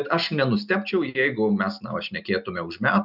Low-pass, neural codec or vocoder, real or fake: 5.4 kHz; none; real